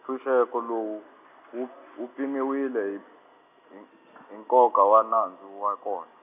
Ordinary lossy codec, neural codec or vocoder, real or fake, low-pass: none; none; real; 3.6 kHz